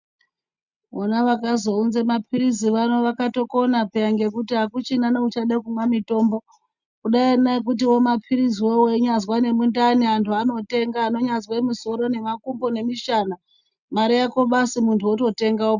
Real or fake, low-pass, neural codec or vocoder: real; 7.2 kHz; none